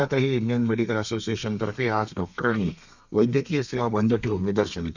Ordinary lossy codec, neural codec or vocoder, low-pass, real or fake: none; codec, 32 kHz, 1.9 kbps, SNAC; 7.2 kHz; fake